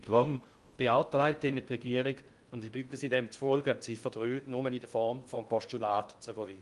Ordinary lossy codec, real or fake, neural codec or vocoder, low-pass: Opus, 32 kbps; fake; codec, 16 kHz in and 24 kHz out, 0.6 kbps, FocalCodec, streaming, 2048 codes; 10.8 kHz